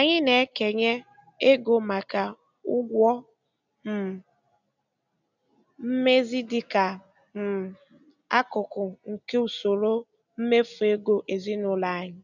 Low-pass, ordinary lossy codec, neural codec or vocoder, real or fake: 7.2 kHz; none; none; real